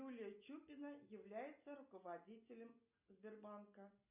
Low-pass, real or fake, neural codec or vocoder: 3.6 kHz; real; none